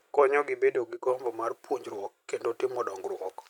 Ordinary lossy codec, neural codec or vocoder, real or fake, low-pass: none; none; real; 19.8 kHz